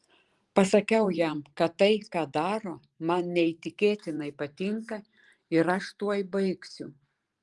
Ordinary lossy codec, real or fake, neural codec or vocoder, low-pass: Opus, 24 kbps; fake; vocoder, 44.1 kHz, 128 mel bands every 512 samples, BigVGAN v2; 10.8 kHz